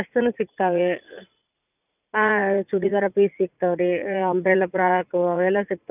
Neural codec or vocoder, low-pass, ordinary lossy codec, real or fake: vocoder, 44.1 kHz, 80 mel bands, Vocos; 3.6 kHz; none; fake